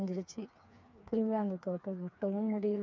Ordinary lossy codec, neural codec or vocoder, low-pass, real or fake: MP3, 64 kbps; codec, 16 kHz, 4 kbps, FreqCodec, smaller model; 7.2 kHz; fake